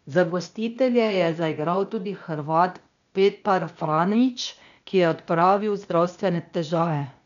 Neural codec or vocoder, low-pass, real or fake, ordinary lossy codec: codec, 16 kHz, 0.8 kbps, ZipCodec; 7.2 kHz; fake; none